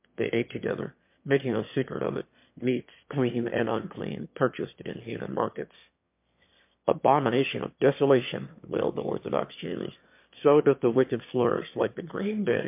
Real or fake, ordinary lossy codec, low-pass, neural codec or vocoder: fake; MP3, 32 kbps; 3.6 kHz; autoencoder, 22.05 kHz, a latent of 192 numbers a frame, VITS, trained on one speaker